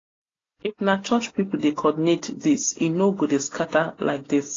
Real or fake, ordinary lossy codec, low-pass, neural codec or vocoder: real; AAC, 32 kbps; 7.2 kHz; none